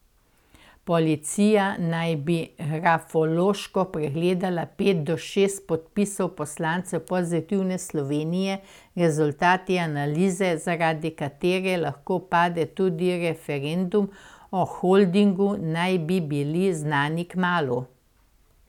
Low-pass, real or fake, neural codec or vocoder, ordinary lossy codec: 19.8 kHz; real; none; none